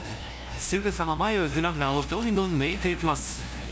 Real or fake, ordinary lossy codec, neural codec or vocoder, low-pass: fake; none; codec, 16 kHz, 0.5 kbps, FunCodec, trained on LibriTTS, 25 frames a second; none